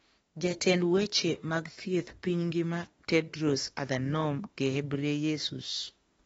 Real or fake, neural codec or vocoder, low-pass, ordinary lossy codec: fake; autoencoder, 48 kHz, 32 numbers a frame, DAC-VAE, trained on Japanese speech; 19.8 kHz; AAC, 24 kbps